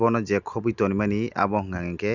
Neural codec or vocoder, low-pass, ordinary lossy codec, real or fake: none; 7.2 kHz; none; real